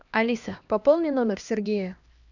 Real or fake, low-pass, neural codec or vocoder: fake; 7.2 kHz; codec, 16 kHz, 1 kbps, X-Codec, HuBERT features, trained on LibriSpeech